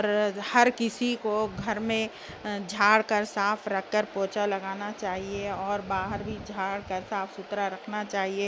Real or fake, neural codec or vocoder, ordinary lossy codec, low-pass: real; none; none; none